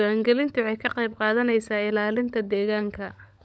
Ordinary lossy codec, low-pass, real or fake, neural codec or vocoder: none; none; fake; codec, 16 kHz, 16 kbps, FunCodec, trained on LibriTTS, 50 frames a second